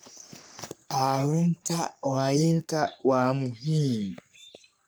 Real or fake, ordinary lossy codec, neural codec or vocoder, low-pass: fake; none; codec, 44.1 kHz, 3.4 kbps, Pupu-Codec; none